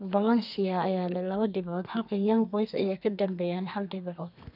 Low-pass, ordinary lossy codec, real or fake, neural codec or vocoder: 5.4 kHz; none; fake; codec, 32 kHz, 1.9 kbps, SNAC